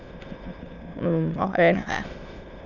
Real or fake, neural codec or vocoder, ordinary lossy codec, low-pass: fake; autoencoder, 22.05 kHz, a latent of 192 numbers a frame, VITS, trained on many speakers; none; 7.2 kHz